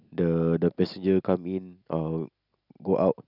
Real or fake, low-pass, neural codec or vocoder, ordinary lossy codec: real; 5.4 kHz; none; none